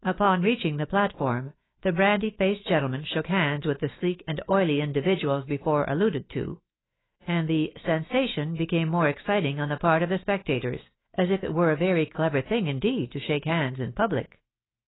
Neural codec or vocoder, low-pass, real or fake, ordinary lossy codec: autoencoder, 48 kHz, 128 numbers a frame, DAC-VAE, trained on Japanese speech; 7.2 kHz; fake; AAC, 16 kbps